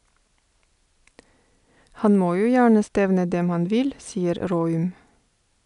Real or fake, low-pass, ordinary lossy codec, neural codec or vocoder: real; 10.8 kHz; none; none